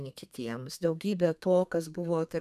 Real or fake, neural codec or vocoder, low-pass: fake; codec, 32 kHz, 1.9 kbps, SNAC; 14.4 kHz